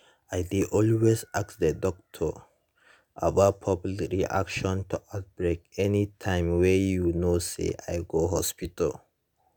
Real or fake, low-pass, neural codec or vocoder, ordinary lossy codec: real; none; none; none